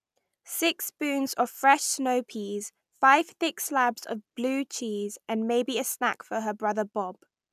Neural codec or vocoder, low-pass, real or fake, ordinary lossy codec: none; 14.4 kHz; real; none